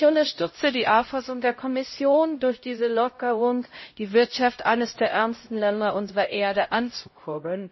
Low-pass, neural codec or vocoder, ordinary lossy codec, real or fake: 7.2 kHz; codec, 16 kHz, 0.5 kbps, X-Codec, HuBERT features, trained on LibriSpeech; MP3, 24 kbps; fake